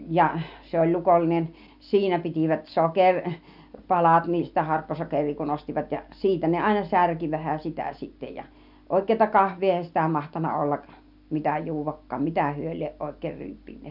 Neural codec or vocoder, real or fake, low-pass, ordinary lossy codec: none; real; 5.4 kHz; none